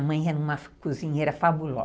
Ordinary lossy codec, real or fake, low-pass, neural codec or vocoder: none; real; none; none